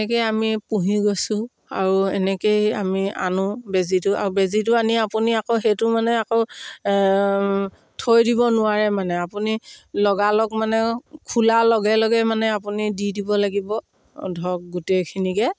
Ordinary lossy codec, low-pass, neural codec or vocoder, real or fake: none; none; none; real